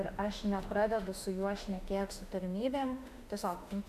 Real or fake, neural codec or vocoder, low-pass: fake; autoencoder, 48 kHz, 32 numbers a frame, DAC-VAE, trained on Japanese speech; 14.4 kHz